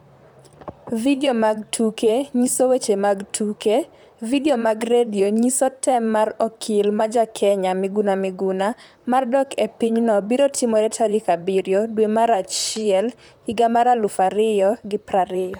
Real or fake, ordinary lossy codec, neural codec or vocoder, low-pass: fake; none; vocoder, 44.1 kHz, 128 mel bands, Pupu-Vocoder; none